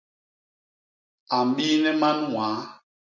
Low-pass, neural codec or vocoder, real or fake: 7.2 kHz; none; real